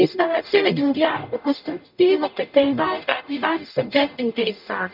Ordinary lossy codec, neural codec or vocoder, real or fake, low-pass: none; codec, 44.1 kHz, 0.9 kbps, DAC; fake; 5.4 kHz